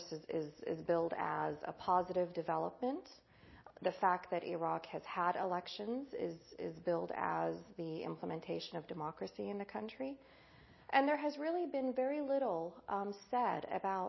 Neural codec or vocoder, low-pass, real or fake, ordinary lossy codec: none; 7.2 kHz; real; MP3, 24 kbps